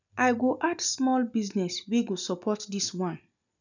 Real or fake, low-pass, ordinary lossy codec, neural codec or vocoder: real; 7.2 kHz; none; none